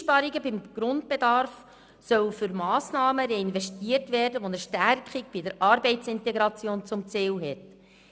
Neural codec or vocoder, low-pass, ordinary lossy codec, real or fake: none; none; none; real